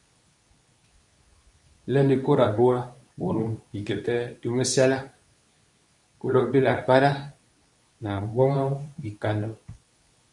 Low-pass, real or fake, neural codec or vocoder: 10.8 kHz; fake; codec, 24 kHz, 0.9 kbps, WavTokenizer, medium speech release version 2